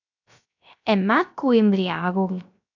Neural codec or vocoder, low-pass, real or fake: codec, 16 kHz, 0.3 kbps, FocalCodec; 7.2 kHz; fake